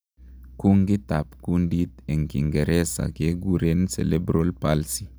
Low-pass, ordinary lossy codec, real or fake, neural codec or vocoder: none; none; real; none